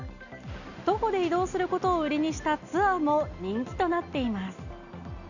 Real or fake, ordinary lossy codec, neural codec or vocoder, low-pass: real; none; none; 7.2 kHz